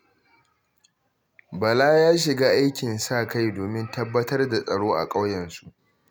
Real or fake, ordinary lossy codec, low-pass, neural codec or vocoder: real; none; none; none